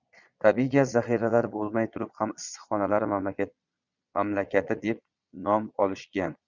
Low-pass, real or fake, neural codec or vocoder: 7.2 kHz; fake; vocoder, 22.05 kHz, 80 mel bands, Vocos